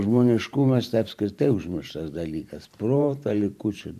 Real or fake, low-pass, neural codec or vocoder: fake; 14.4 kHz; vocoder, 44.1 kHz, 128 mel bands every 512 samples, BigVGAN v2